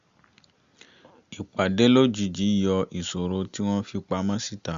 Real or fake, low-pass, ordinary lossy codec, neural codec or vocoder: real; 7.2 kHz; Opus, 64 kbps; none